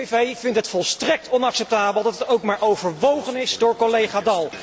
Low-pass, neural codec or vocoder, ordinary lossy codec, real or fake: none; none; none; real